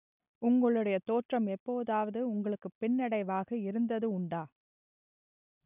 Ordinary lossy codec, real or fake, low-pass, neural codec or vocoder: none; real; 3.6 kHz; none